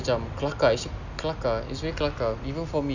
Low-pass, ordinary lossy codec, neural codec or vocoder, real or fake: 7.2 kHz; none; none; real